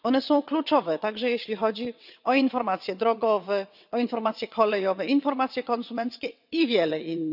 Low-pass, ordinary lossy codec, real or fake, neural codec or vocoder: 5.4 kHz; none; fake; vocoder, 22.05 kHz, 80 mel bands, WaveNeXt